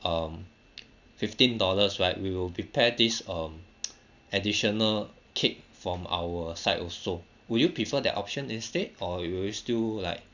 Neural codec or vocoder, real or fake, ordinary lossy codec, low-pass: none; real; none; 7.2 kHz